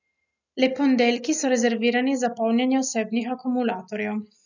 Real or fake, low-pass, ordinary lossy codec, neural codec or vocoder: real; 7.2 kHz; none; none